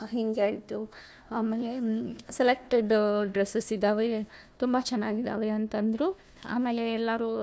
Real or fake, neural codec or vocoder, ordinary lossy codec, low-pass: fake; codec, 16 kHz, 1 kbps, FunCodec, trained on Chinese and English, 50 frames a second; none; none